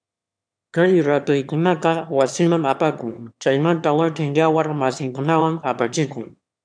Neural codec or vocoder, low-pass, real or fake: autoencoder, 22.05 kHz, a latent of 192 numbers a frame, VITS, trained on one speaker; 9.9 kHz; fake